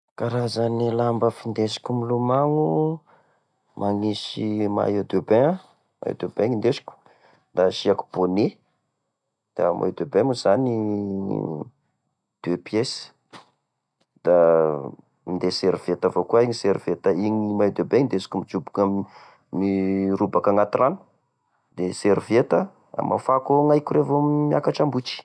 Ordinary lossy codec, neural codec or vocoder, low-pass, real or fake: none; none; none; real